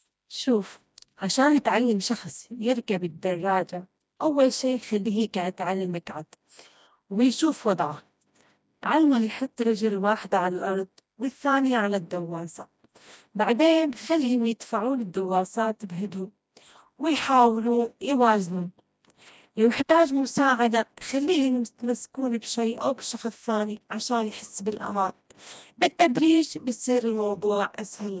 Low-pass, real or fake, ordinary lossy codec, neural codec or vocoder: none; fake; none; codec, 16 kHz, 1 kbps, FreqCodec, smaller model